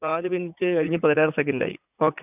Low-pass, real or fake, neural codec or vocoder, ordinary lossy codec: 3.6 kHz; fake; vocoder, 44.1 kHz, 80 mel bands, Vocos; none